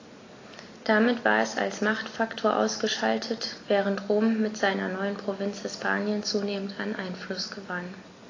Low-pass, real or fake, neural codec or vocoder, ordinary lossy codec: 7.2 kHz; real; none; AAC, 32 kbps